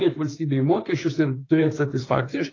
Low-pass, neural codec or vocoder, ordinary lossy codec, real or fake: 7.2 kHz; autoencoder, 48 kHz, 32 numbers a frame, DAC-VAE, trained on Japanese speech; AAC, 32 kbps; fake